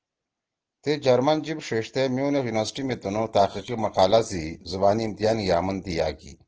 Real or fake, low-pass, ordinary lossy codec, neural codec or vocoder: real; 7.2 kHz; Opus, 16 kbps; none